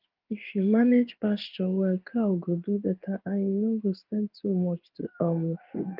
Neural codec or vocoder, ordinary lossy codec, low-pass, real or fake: codec, 16 kHz in and 24 kHz out, 1 kbps, XY-Tokenizer; Opus, 32 kbps; 5.4 kHz; fake